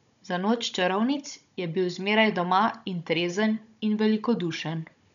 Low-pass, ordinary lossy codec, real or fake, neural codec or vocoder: 7.2 kHz; none; fake; codec, 16 kHz, 16 kbps, FunCodec, trained on Chinese and English, 50 frames a second